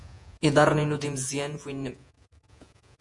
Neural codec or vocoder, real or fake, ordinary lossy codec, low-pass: vocoder, 48 kHz, 128 mel bands, Vocos; fake; MP3, 64 kbps; 10.8 kHz